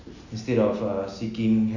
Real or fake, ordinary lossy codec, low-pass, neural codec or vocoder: real; none; 7.2 kHz; none